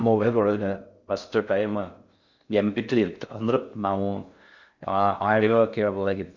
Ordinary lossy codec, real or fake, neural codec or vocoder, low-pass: Opus, 64 kbps; fake; codec, 16 kHz in and 24 kHz out, 0.6 kbps, FocalCodec, streaming, 4096 codes; 7.2 kHz